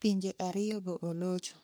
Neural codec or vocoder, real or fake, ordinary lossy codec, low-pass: codec, 44.1 kHz, 3.4 kbps, Pupu-Codec; fake; none; none